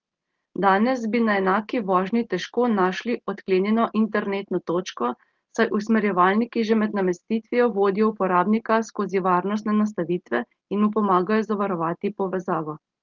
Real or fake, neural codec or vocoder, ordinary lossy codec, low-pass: real; none; Opus, 16 kbps; 7.2 kHz